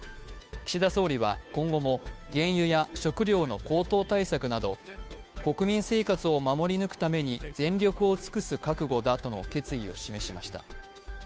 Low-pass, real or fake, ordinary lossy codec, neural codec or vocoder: none; fake; none; codec, 16 kHz, 2 kbps, FunCodec, trained on Chinese and English, 25 frames a second